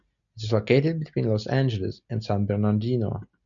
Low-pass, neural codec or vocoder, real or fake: 7.2 kHz; none; real